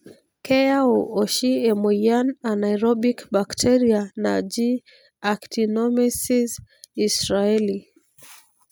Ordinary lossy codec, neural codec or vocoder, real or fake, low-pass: none; none; real; none